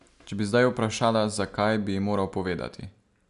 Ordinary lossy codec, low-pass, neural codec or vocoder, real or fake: none; 10.8 kHz; none; real